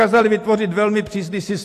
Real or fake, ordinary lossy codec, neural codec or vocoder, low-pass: real; AAC, 64 kbps; none; 14.4 kHz